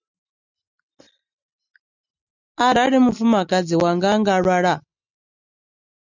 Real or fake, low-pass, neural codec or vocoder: real; 7.2 kHz; none